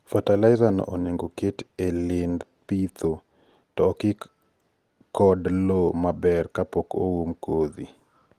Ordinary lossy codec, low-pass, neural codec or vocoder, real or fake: Opus, 24 kbps; 14.4 kHz; none; real